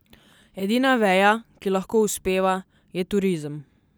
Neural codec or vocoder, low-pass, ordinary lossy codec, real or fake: none; none; none; real